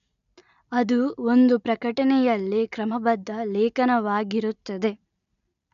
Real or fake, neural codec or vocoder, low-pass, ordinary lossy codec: real; none; 7.2 kHz; none